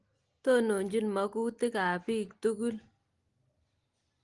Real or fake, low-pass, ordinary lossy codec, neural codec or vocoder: real; 10.8 kHz; Opus, 24 kbps; none